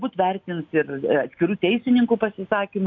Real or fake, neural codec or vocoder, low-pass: real; none; 7.2 kHz